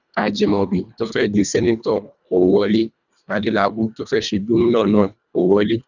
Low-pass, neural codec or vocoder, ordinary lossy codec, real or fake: 7.2 kHz; codec, 24 kHz, 1.5 kbps, HILCodec; none; fake